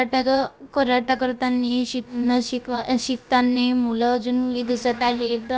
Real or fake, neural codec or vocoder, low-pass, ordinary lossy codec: fake; codec, 16 kHz, about 1 kbps, DyCAST, with the encoder's durations; none; none